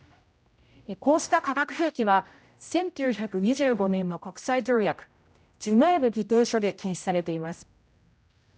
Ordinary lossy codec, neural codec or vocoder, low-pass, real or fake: none; codec, 16 kHz, 0.5 kbps, X-Codec, HuBERT features, trained on general audio; none; fake